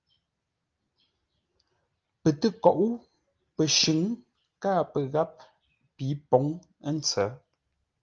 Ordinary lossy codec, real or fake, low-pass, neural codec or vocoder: Opus, 24 kbps; real; 7.2 kHz; none